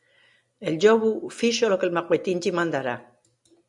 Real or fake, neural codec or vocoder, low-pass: real; none; 10.8 kHz